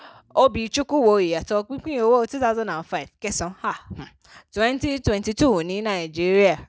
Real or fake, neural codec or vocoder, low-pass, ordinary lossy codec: real; none; none; none